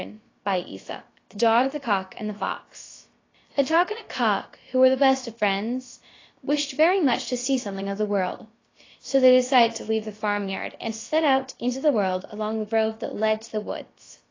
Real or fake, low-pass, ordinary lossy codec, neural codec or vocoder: fake; 7.2 kHz; AAC, 32 kbps; codec, 16 kHz, about 1 kbps, DyCAST, with the encoder's durations